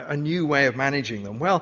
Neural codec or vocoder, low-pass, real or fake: none; 7.2 kHz; real